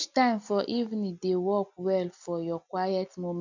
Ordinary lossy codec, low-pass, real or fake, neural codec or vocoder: none; 7.2 kHz; real; none